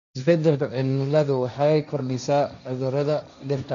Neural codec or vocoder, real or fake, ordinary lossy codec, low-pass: codec, 16 kHz, 1.1 kbps, Voila-Tokenizer; fake; none; 7.2 kHz